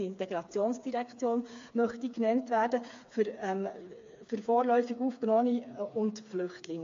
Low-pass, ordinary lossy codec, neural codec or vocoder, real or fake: 7.2 kHz; AAC, 48 kbps; codec, 16 kHz, 4 kbps, FreqCodec, smaller model; fake